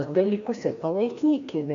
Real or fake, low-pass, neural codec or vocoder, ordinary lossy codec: fake; 7.2 kHz; codec, 16 kHz, 1 kbps, FreqCodec, larger model; MP3, 96 kbps